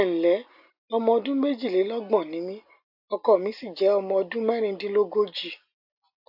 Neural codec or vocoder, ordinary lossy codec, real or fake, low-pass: none; AAC, 48 kbps; real; 5.4 kHz